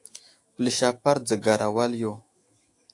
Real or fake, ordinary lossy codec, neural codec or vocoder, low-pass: fake; AAC, 48 kbps; autoencoder, 48 kHz, 128 numbers a frame, DAC-VAE, trained on Japanese speech; 10.8 kHz